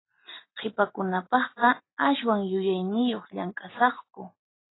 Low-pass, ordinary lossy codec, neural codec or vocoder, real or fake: 7.2 kHz; AAC, 16 kbps; none; real